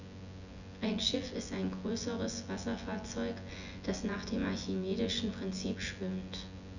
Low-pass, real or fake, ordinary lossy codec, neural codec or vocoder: 7.2 kHz; fake; none; vocoder, 24 kHz, 100 mel bands, Vocos